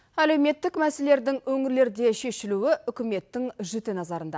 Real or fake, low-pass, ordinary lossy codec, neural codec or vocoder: real; none; none; none